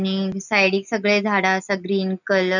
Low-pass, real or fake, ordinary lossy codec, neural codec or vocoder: 7.2 kHz; real; none; none